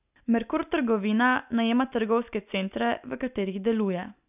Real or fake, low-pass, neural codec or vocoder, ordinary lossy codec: real; 3.6 kHz; none; none